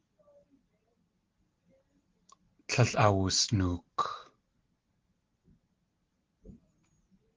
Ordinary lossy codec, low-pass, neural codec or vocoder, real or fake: Opus, 16 kbps; 7.2 kHz; none; real